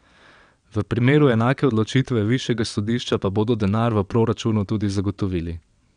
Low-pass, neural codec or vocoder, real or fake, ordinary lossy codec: 9.9 kHz; vocoder, 22.05 kHz, 80 mel bands, WaveNeXt; fake; none